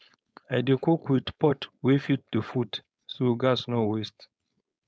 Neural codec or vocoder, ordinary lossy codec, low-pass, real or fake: codec, 16 kHz, 4.8 kbps, FACodec; none; none; fake